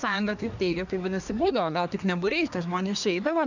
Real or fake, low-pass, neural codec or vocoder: fake; 7.2 kHz; codec, 24 kHz, 1 kbps, SNAC